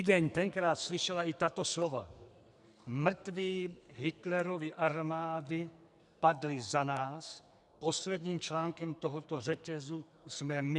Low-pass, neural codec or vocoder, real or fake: 10.8 kHz; codec, 44.1 kHz, 2.6 kbps, SNAC; fake